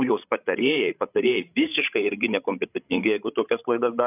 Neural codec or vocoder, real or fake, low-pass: codec, 16 kHz, 16 kbps, FreqCodec, larger model; fake; 3.6 kHz